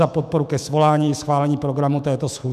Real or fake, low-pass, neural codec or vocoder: fake; 14.4 kHz; autoencoder, 48 kHz, 128 numbers a frame, DAC-VAE, trained on Japanese speech